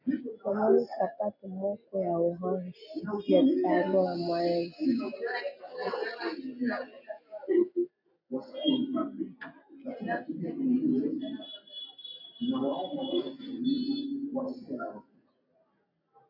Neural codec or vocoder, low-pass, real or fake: none; 5.4 kHz; real